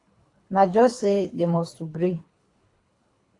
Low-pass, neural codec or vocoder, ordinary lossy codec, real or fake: 10.8 kHz; codec, 24 kHz, 3 kbps, HILCodec; AAC, 48 kbps; fake